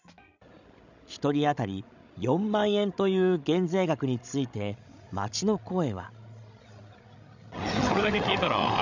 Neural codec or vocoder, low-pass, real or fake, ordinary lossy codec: codec, 16 kHz, 16 kbps, FreqCodec, larger model; 7.2 kHz; fake; none